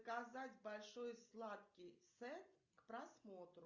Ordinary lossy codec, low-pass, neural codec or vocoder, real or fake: Opus, 64 kbps; 7.2 kHz; none; real